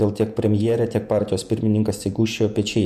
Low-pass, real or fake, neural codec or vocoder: 14.4 kHz; fake; vocoder, 48 kHz, 128 mel bands, Vocos